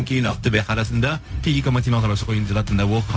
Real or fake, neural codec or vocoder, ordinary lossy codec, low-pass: fake; codec, 16 kHz, 0.4 kbps, LongCat-Audio-Codec; none; none